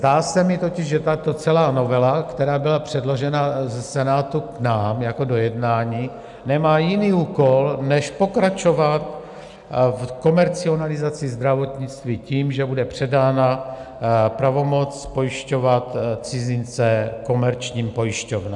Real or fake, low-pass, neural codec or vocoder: real; 10.8 kHz; none